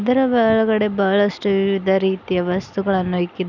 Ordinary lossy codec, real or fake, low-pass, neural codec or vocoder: none; real; 7.2 kHz; none